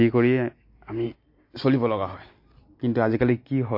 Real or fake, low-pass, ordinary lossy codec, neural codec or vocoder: real; 5.4 kHz; MP3, 32 kbps; none